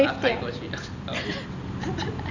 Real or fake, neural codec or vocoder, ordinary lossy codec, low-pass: real; none; none; 7.2 kHz